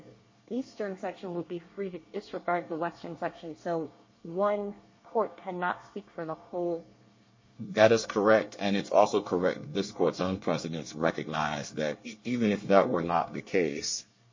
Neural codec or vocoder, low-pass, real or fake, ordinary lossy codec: codec, 24 kHz, 1 kbps, SNAC; 7.2 kHz; fake; MP3, 32 kbps